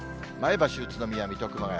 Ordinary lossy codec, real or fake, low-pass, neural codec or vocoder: none; real; none; none